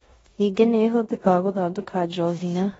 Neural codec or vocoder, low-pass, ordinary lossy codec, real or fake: codec, 16 kHz in and 24 kHz out, 0.9 kbps, LongCat-Audio-Codec, four codebook decoder; 10.8 kHz; AAC, 24 kbps; fake